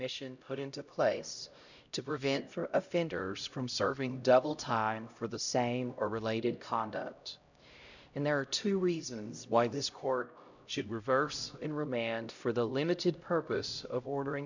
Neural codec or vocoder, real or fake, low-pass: codec, 16 kHz, 0.5 kbps, X-Codec, HuBERT features, trained on LibriSpeech; fake; 7.2 kHz